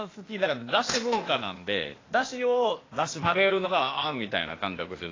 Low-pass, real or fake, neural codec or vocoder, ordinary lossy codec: 7.2 kHz; fake; codec, 16 kHz, 0.8 kbps, ZipCodec; AAC, 32 kbps